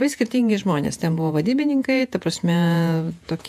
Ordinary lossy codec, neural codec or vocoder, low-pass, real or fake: AAC, 96 kbps; vocoder, 48 kHz, 128 mel bands, Vocos; 14.4 kHz; fake